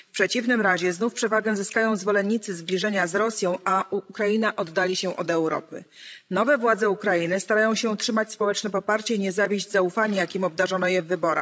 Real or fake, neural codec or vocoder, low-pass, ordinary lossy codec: fake; codec, 16 kHz, 16 kbps, FreqCodec, larger model; none; none